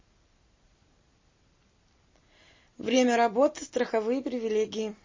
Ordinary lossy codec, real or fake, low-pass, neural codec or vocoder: MP3, 32 kbps; real; 7.2 kHz; none